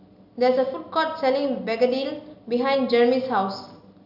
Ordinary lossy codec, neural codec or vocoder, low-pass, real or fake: none; none; 5.4 kHz; real